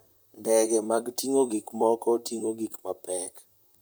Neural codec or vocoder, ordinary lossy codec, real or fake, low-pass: vocoder, 44.1 kHz, 128 mel bands, Pupu-Vocoder; none; fake; none